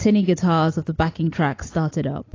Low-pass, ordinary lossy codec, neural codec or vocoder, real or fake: 7.2 kHz; AAC, 32 kbps; none; real